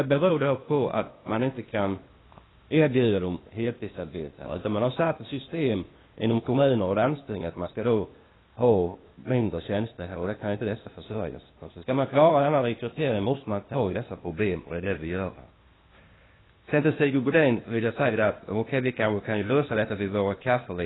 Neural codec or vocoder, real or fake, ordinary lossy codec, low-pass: codec, 16 kHz, 0.8 kbps, ZipCodec; fake; AAC, 16 kbps; 7.2 kHz